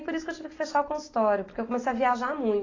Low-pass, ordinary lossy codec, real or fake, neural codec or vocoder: 7.2 kHz; AAC, 32 kbps; real; none